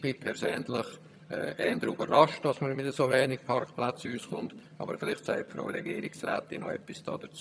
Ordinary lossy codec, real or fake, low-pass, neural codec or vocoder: none; fake; none; vocoder, 22.05 kHz, 80 mel bands, HiFi-GAN